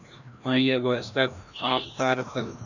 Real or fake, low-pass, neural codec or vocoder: fake; 7.2 kHz; codec, 16 kHz, 1 kbps, FreqCodec, larger model